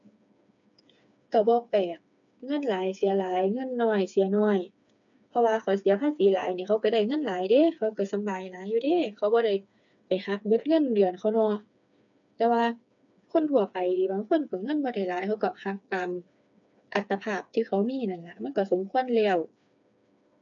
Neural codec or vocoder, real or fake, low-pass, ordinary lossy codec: codec, 16 kHz, 4 kbps, FreqCodec, smaller model; fake; 7.2 kHz; none